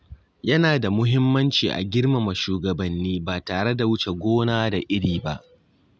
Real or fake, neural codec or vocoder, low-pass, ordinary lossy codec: real; none; none; none